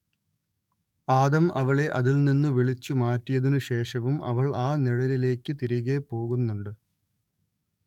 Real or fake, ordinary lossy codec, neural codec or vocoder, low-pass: fake; MP3, 96 kbps; codec, 44.1 kHz, 7.8 kbps, DAC; 19.8 kHz